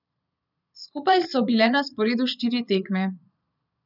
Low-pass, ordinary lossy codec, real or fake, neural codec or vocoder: 5.4 kHz; none; fake; vocoder, 22.05 kHz, 80 mel bands, Vocos